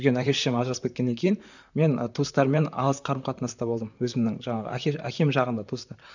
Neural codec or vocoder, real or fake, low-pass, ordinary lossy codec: vocoder, 44.1 kHz, 128 mel bands, Pupu-Vocoder; fake; 7.2 kHz; none